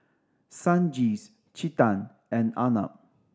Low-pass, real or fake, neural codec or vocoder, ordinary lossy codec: none; real; none; none